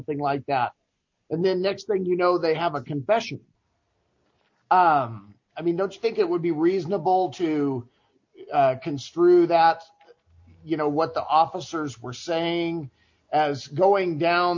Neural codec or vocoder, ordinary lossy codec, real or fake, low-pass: none; MP3, 48 kbps; real; 7.2 kHz